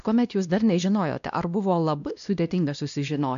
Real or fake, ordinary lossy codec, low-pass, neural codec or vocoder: fake; MP3, 96 kbps; 7.2 kHz; codec, 16 kHz, 1 kbps, X-Codec, WavLM features, trained on Multilingual LibriSpeech